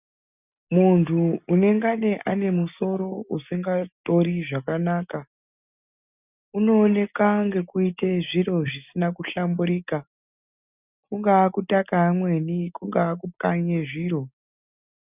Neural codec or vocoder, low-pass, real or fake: none; 3.6 kHz; real